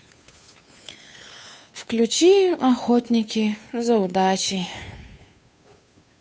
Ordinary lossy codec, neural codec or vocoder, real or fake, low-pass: none; codec, 16 kHz, 2 kbps, FunCodec, trained on Chinese and English, 25 frames a second; fake; none